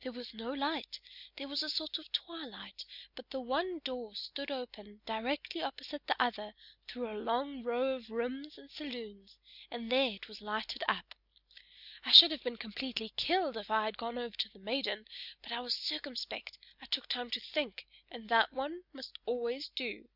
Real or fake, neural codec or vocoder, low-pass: real; none; 5.4 kHz